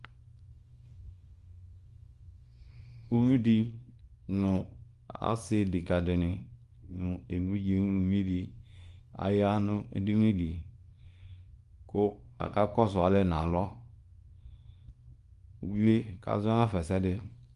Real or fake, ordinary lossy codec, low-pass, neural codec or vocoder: fake; Opus, 32 kbps; 10.8 kHz; codec, 24 kHz, 0.9 kbps, WavTokenizer, small release